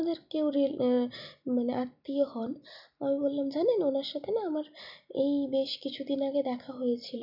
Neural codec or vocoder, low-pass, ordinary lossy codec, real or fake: none; 5.4 kHz; none; real